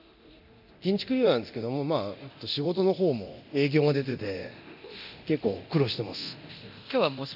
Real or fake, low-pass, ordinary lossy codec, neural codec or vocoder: fake; 5.4 kHz; AAC, 48 kbps; codec, 24 kHz, 0.9 kbps, DualCodec